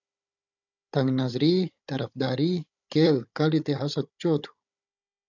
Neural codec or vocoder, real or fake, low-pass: codec, 16 kHz, 16 kbps, FunCodec, trained on Chinese and English, 50 frames a second; fake; 7.2 kHz